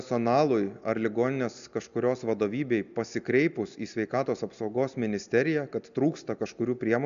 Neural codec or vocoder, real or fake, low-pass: none; real; 7.2 kHz